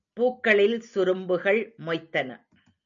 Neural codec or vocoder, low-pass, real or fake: none; 7.2 kHz; real